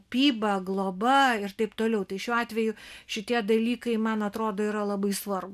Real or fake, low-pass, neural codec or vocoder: real; 14.4 kHz; none